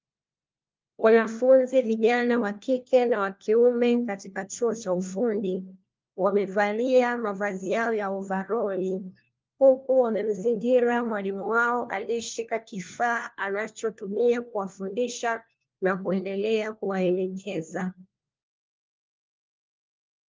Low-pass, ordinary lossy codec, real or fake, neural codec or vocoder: 7.2 kHz; Opus, 32 kbps; fake; codec, 16 kHz, 1 kbps, FunCodec, trained on LibriTTS, 50 frames a second